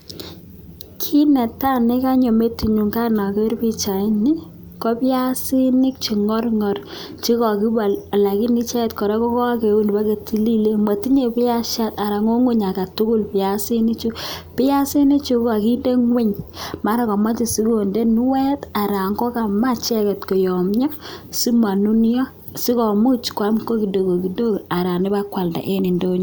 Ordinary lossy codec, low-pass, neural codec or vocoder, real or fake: none; none; none; real